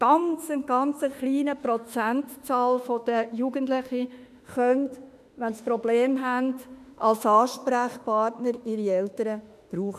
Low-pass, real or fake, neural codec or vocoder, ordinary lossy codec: 14.4 kHz; fake; autoencoder, 48 kHz, 32 numbers a frame, DAC-VAE, trained on Japanese speech; none